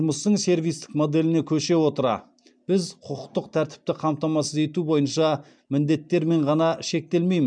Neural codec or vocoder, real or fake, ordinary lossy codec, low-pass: none; real; MP3, 96 kbps; 9.9 kHz